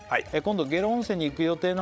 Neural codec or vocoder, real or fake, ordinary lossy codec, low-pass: codec, 16 kHz, 16 kbps, FreqCodec, larger model; fake; none; none